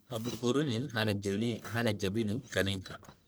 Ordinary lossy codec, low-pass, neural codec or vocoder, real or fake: none; none; codec, 44.1 kHz, 1.7 kbps, Pupu-Codec; fake